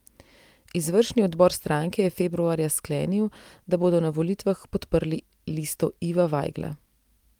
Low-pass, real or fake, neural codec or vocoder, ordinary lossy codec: 19.8 kHz; real; none; Opus, 32 kbps